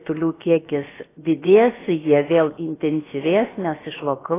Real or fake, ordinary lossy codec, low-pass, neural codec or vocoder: fake; AAC, 16 kbps; 3.6 kHz; codec, 16 kHz, about 1 kbps, DyCAST, with the encoder's durations